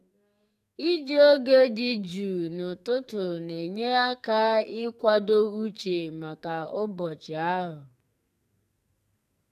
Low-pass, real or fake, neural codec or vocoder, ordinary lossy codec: 14.4 kHz; fake; codec, 44.1 kHz, 2.6 kbps, SNAC; none